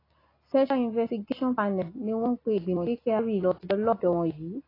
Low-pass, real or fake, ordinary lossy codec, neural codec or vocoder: 5.4 kHz; real; AAC, 24 kbps; none